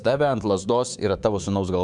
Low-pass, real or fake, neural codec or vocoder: 10.8 kHz; fake; codec, 24 kHz, 3.1 kbps, DualCodec